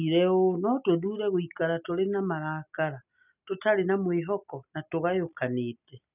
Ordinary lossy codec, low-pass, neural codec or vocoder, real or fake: none; 3.6 kHz; none; real